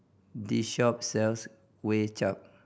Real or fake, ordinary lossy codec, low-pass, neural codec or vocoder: real; none; none; none